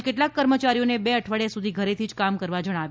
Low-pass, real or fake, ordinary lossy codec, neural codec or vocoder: none; real; none; none